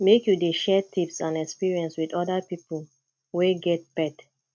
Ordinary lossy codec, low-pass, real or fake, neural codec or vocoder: none; none; real; none